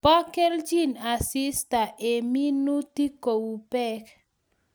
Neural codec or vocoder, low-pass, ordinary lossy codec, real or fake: none; none; none; real